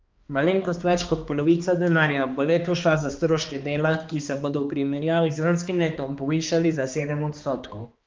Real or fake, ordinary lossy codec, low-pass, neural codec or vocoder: fake; Opus, 24 kbps; 7.2 kHz; codec, 16 kHz, 2 kbps, X-Codec, HuBERT features, trained on balanced general audio